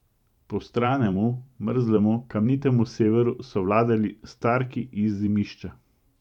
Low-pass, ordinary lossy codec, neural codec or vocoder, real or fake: 19.8 kHz; none; vocoder, 44.1 kHz, 128 mel bands every 512 samples, BigVGAN v2; fake